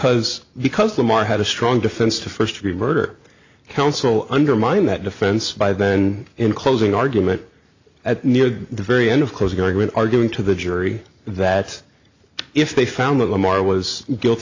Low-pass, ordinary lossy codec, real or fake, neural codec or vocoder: 7.2 kHz; AAC, 48 kbps; real; none